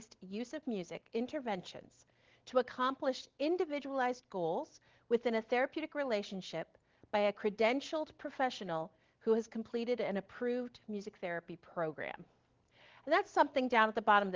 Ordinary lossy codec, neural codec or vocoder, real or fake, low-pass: Opus, 16 kbps; none; real; 7.2 kHz